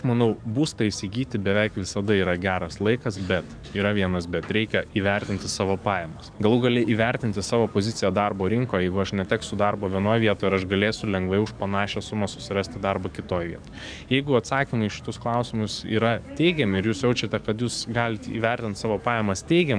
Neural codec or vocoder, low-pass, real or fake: codec, 44.1 kHz, 7.8 kbps, DAC; 9.9 kHz; fake